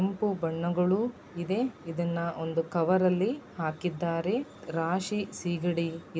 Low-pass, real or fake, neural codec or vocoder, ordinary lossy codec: none; real; none; none